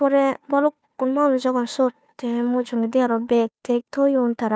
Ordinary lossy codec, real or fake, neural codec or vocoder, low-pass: none; fake; codec, 16 kHz, 2 kbps, FunCodec, trained on Chinese and English, 25 frames a second; none